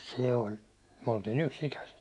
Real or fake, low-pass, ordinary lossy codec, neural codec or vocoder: fake; 10.8 kHz; none; autoencoder, 48 kHz, 128 numbers a frame, DAC-VAE, trained on Japanese speech